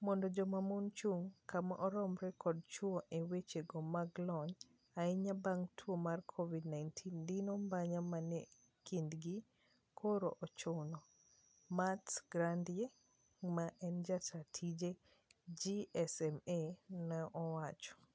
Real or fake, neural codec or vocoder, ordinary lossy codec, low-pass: real; none; none; none